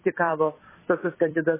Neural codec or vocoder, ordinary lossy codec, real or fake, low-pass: vocoder, 44.1 kHz, 128 mel bands every 256 samples, BigVGAN v2; MP3, 16 kbps; fake; 3.6 kHz